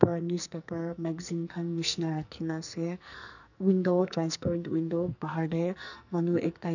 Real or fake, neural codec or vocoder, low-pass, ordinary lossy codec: fake; codec, 44.1 kHz, 2.6 kbps, SNAC; 7.2 kHz; none